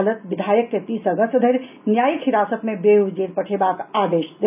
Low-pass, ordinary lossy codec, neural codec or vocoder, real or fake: 3.6 kHz; none; none; real